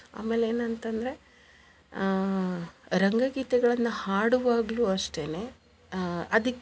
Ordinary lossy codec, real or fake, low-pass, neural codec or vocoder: none; real; none; none